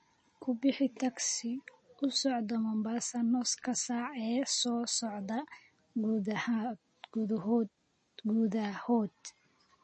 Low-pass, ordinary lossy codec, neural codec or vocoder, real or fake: 10.8 kHz; MP3, 32 kbps; none; real